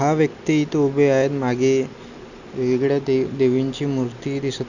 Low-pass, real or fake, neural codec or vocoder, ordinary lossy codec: 7.2 kHz; real; none; none